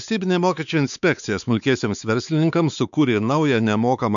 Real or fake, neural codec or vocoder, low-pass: fake; codec, 16 kHz, 4 kbps, X-Codec, WavLM features, trained on Multilingual LibriSpeech; 7.2 kHz